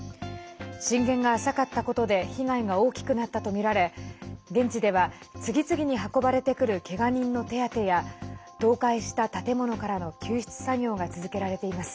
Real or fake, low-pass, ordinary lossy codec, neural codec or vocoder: real; none; none; none